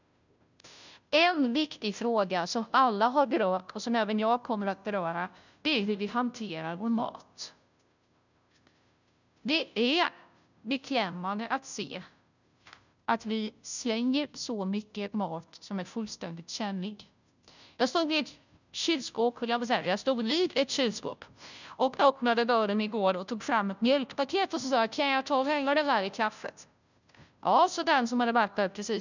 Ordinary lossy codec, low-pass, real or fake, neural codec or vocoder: none; 7.2 kHz; fake; codec, 16 kHz, 0.5 kbps, FunCodec, trained on Chinese and English, 25 frames a second